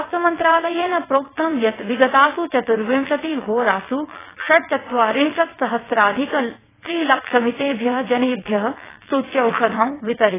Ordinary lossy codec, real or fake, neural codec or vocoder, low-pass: AAC, 16 kbps; fake; vocoder, 22.05 kHz, 80 mel bands, WaveNeXt; 3.6 kHz